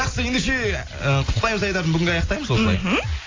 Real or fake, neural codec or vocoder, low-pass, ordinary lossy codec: real; none; 7.2 kHz; AAC, 32 kbps